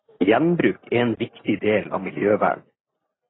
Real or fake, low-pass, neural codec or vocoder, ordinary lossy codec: real; 7.2 kHz; none; AAC, 16 kbps